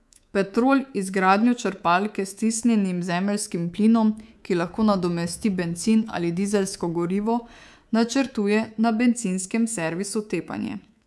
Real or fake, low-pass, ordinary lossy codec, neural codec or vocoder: fake; none; none; codec, 24 kHz, 3.1 kbps, DualCodec